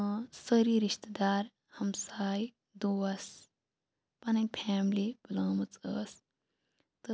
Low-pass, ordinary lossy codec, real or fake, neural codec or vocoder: none; none; real; none